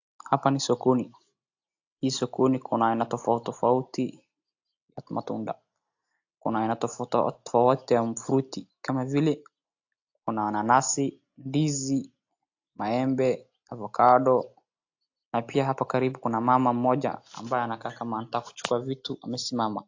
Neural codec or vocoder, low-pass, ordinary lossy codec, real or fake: none; 7.2 kHz; AAC, 48 kbps; real